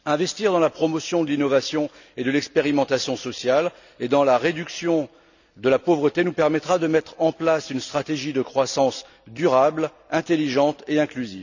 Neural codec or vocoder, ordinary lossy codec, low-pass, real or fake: none; none; 7.2 kHz; real